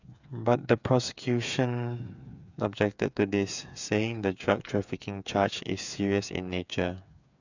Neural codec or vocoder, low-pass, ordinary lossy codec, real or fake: codec, 16 kHz, 8 kbps, FreqCodec, smaller model; 7.2 kHz; none; fake